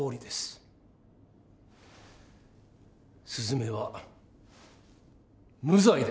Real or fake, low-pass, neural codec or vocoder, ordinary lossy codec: real; none; none; none